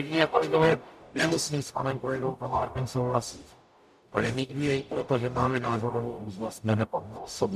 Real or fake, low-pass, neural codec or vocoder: fake; 14.4 kHz; codec, 44.1 kHz, 0.9 kbps, DAC